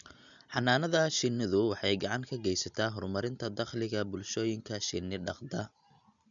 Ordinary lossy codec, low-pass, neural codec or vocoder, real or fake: none; 7.2 kHz; none; real